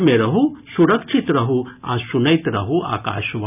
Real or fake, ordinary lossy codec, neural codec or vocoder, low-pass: real; none; none; 3.6 kHz